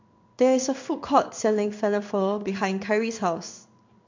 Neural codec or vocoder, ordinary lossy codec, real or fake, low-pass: codec, 16 kHz in and 24 kHz out, 1 kbps, XY-Tokenizer; MP3, 48 kbps; fake; 7.2 kHz